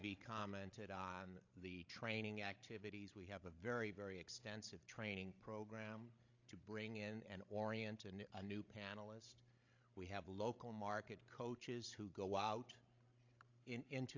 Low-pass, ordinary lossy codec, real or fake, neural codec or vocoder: 7.2 kHz; AAC, 48 kbps; fake; codec, 16 kHz, 16 kbps, FreqCodec, larger model